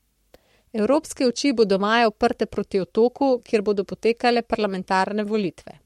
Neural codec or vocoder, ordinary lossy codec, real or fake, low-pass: codec, 44.1 kHz, 7.8 kbps, Pupu-Codec; MP3, 64 kbps; fake; 19.8 kHz